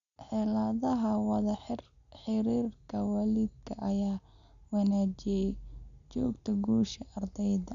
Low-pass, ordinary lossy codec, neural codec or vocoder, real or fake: 7.2 kHz; none; none; real